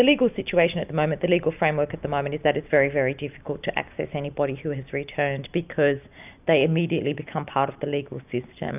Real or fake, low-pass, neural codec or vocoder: real; 3.6 kHz; none